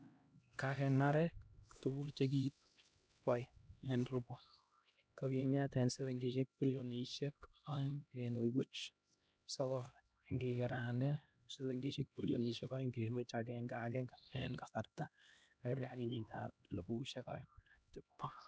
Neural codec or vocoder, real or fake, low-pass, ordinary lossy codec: codec, 16 kHz, 1 kbps, X-Codec, HuBERT features, trained on LibriSpeech; fake; none; none